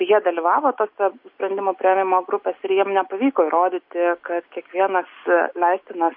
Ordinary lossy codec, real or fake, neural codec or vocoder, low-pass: MP3, 32 kbps; real; none; 5.4 kHz